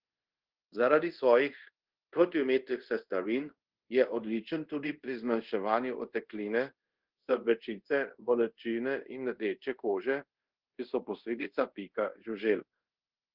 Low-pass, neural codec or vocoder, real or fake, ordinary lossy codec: 5.4 kHz; codec, 24 kHz, 0.5 kbps, DualCodec; fake; Opus, 16 kbps